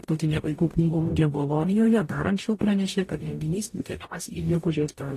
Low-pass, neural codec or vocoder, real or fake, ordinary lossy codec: 14.4 kHz; codec, 44.1 kHz, 0.9 kbps, DAC; fake; AAC, 48 kbps